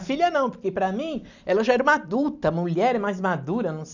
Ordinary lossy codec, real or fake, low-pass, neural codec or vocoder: none; real; 7.2 kHz; none